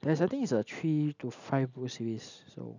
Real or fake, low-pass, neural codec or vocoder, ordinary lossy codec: real; 7.2 kHz; none; none